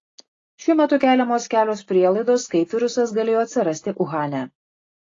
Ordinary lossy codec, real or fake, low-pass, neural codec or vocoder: AAC, 32 kbps; real; 7.2 kHz; none